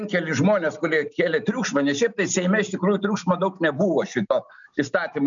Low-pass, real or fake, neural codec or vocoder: 7.2 kHz; real; none